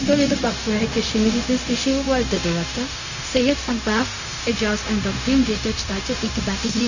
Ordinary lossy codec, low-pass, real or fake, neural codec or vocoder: none; 7.2 kHz; fake; codec, 16 kHz, 0.4 kbps, LongCat-Audio-Codec